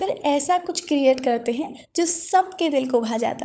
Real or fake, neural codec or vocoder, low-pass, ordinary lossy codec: fake; codec, 16 kHz, 16 kbps, FunCodec, trained on LibriTTS, 50 frames a second; none; none